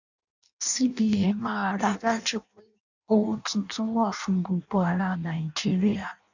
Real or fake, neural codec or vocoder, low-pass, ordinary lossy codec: fake; codec, 16 kHz in and 24 kHz out, 0.6 kbps, FireRedTTS-2 codec; 7.2 kHz; none